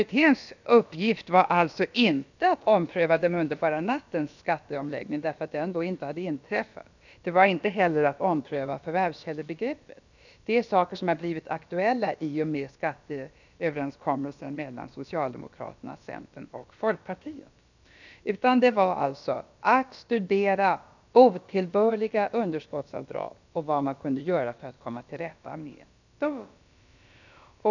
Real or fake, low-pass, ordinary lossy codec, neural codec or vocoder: fake; 7.2 kHz; none; codec, 16 kHz, about 1 kbps, DyCAST, with the encoder's durations